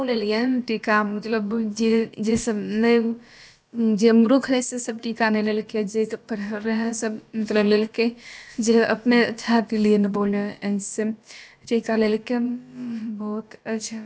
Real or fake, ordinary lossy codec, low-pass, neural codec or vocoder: fake; none; none; codec, 16 kHz, about 1 kbps, DyCAST, with the encoder's durations